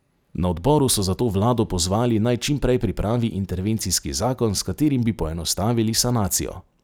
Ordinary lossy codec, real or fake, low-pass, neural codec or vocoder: none; real; none; none